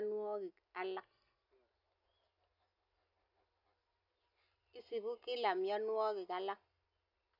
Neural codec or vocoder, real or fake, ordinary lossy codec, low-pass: none; real; none; 5.4 kHz